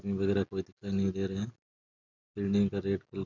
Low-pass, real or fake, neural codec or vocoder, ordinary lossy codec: 7.2 kHz; real; none; none